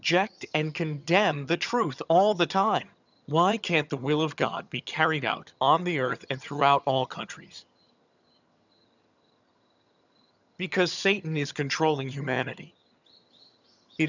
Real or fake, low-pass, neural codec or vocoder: fake; 7.2 kHz; vocoder, 22.05 kHz, 80 mel bands, HiFi-GAN